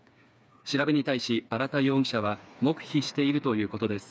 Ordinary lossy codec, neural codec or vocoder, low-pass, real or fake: none; codec, 16 kHz, 4 kbps, FreqCodec, smaller model; none; fake